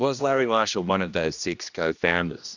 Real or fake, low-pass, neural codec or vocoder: fake; 7.2 kHz; codec, 16 kHz, 1 kbps, X-Codec, HuBERT features, trained on general audio